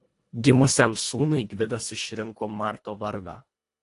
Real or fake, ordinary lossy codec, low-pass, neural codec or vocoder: fake; AAC, 48 kbps; 10.8 kHz; codec, 24 kHz, 1.5 kbps, HILCodec